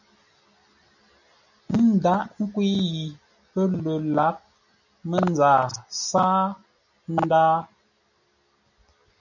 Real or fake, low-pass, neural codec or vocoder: real; 7.2 kHz; none